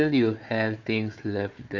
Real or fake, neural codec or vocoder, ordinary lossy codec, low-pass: fake; codec, 16 kHz, 4 kbps, FunCodec, trained on Chinese and English, 50 frames a second; none; 7.2 kHz